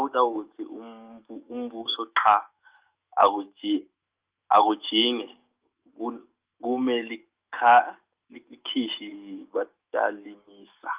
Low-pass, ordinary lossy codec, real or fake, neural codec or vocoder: 3.6 kHz; Opus, 32 kbps; fake; autoencoder, 48 kHz, 128 numbers a frame, DAC-VAE, trained on Japanese speech